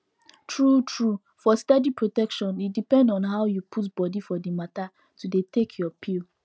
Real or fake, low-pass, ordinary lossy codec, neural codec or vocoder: real; none; none; none